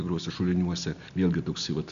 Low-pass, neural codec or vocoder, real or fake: 7.2 kHz; none; real